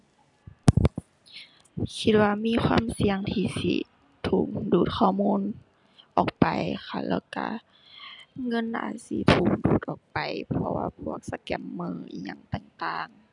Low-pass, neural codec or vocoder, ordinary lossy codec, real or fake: 10.8 kHz; none; none; real